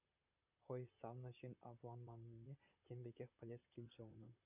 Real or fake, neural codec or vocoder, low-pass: fake; vocoder, 44.1 kHz, 128 mel bands, Pupu-Vocoder; 3.6 kHz